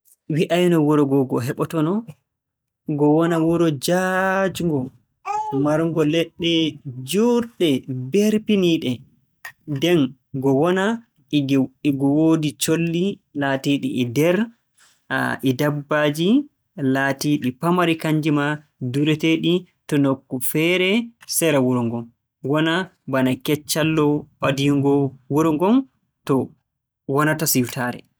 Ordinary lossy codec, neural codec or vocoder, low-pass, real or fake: none; none; none; real